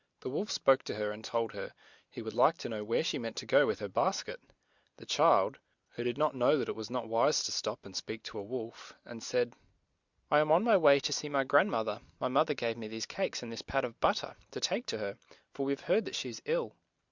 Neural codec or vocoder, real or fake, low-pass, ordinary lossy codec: none; real; 7.2 kHz; Opus, 64 kbps